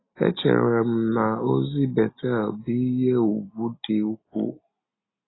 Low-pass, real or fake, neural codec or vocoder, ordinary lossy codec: 7.2 kHz; real; none; AAC, 16 kbps